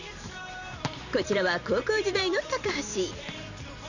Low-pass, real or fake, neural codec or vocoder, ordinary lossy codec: 7.2 kHz; fake; vocoder, 44.1 kHz, 128 mel bands every 512 samples, BigVGAN v2; none